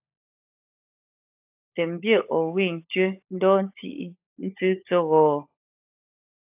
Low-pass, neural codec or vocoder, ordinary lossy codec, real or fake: 3.6 kHz; codec, 16 kHz, 16 kbps, FunCodec, trained on LibriTTS, 50 frames a second; AAC, 32 kbps; fake